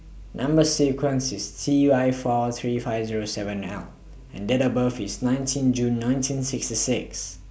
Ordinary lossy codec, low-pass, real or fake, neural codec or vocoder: none; none; real; none